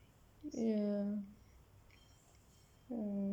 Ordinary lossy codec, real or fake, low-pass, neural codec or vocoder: none; real; 19.8 kHz; none